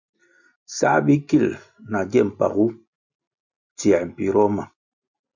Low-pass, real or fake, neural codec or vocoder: 7.2 kHz; real; none